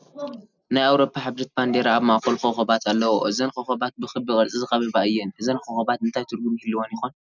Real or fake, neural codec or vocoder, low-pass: real; none; 7.2 kHz